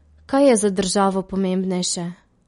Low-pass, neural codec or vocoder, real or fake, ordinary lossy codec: 10.8 kHz; none; real; MP3, 48 kbps